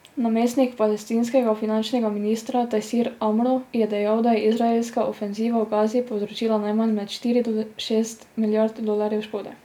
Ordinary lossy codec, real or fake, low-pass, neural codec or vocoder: none; real; 19.8 kHz; none